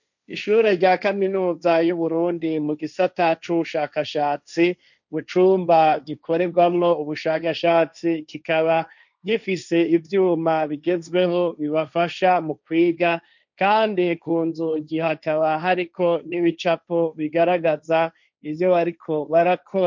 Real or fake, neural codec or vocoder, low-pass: fake; codec, 16 kHz, 1.1 kbps, Voila-Tokenizer; 7.2 kHz